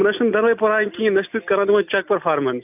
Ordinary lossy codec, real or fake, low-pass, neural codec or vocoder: none; real; 3.6 kHz; none